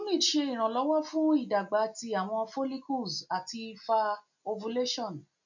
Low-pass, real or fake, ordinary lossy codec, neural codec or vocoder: 7.2 kHz; real; none; none